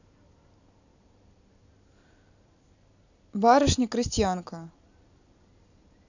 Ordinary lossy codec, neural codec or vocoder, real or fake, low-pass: none; none; real; 7.2 kHz